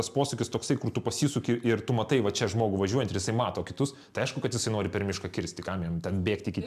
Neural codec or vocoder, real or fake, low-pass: none; real; 14.4 kHz